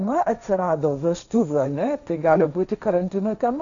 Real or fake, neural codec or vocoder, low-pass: fake; codec, 16 kHz, 1.1 kbps, Voila-Tokenizer; 7.2 kHz